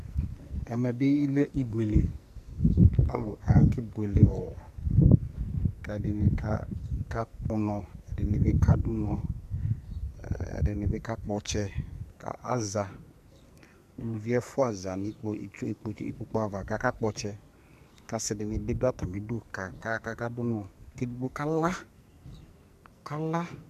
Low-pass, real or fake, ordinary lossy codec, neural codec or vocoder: 14.4 kHz; fake; MP3, 96 kbps; codec, 44.1 kHz, 2.6 kbps, SNAC